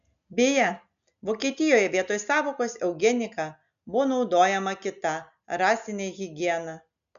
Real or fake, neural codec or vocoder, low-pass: real; none; 7.2 kHz